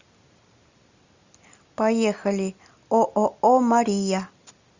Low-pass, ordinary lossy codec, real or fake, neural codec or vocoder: 7.2 kHz; Opus, 64 kbps; real; none